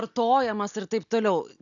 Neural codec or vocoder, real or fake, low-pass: none; real; 7.2 kHz